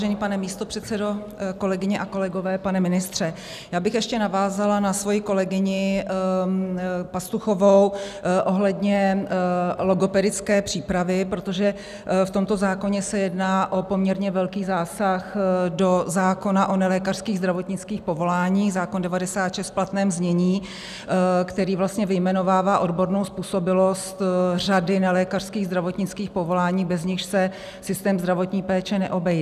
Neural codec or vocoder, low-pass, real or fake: none; 14.4 kHz; real